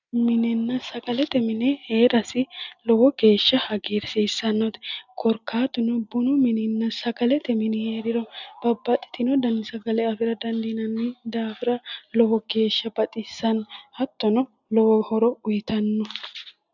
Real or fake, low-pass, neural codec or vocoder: real; 7.2 kHz; none